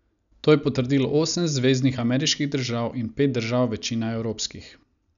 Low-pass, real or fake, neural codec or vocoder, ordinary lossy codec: 7.2 kHz; real; none; none